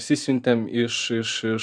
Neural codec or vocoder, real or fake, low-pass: vocoder, 44.1 kHz, 128 mel bands every 512 samples, BigVGAN v2; fake; 9.9 kHz